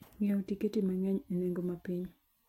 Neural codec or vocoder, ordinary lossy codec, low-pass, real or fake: none; MP3, 64 kbps; 19.8 kHz; real